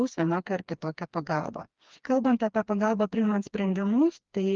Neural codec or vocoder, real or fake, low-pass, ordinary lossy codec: codec, 16 kHz, 2 kbps, FreqCodec, smaller model; fake; 7.2 kHz; Opus, 32 kbps